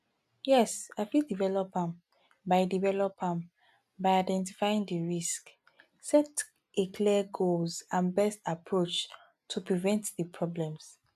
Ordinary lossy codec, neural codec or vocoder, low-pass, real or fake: AAC, 96 kbps; none; 14.4 kHz; real